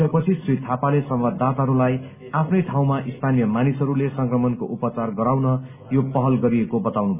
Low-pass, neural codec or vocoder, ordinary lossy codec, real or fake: 3.6 kHz; none; none; real